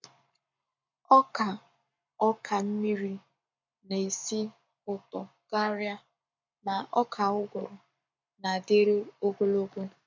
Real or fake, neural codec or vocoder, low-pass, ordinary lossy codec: fake; codec, 44.1 kHz, 7.8 kbps, Pupu-Codec; 7.2 kHz; MP3, 64 kbps